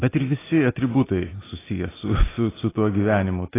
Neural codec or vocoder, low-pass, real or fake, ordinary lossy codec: none; 3.6 kHz; real; AAC, 16 kbps